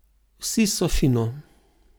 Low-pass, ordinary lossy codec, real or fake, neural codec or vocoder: none; none; real; none